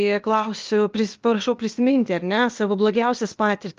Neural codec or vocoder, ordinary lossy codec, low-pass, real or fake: codec, 16 kHz, 0.8 kbps, ZipCodec; Opus, 32 kbps; 7.2 kHz; fake